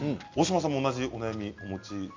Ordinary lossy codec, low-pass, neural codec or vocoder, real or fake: MP3, 48 kbps; 7.2 kHz; none; real